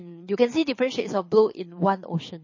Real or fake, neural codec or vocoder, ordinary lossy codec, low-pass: fake; codec, 24 kHz, 6 kbps, HILCodec; MP3, 32 kbps; 7.2 kHz